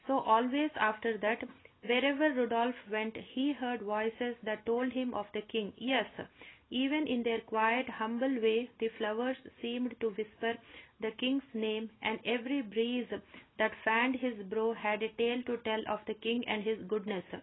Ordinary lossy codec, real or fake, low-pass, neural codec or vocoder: AAC, 16 kbps; real; 7.2 kHz; none